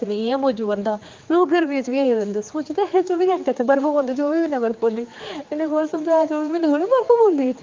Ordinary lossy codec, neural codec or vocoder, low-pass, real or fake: Opus, 32 kbps; codec, 16 kHz, 4 kbps, X-Codec, HuBERT features, trained on general audio; 7.2 kHz; fake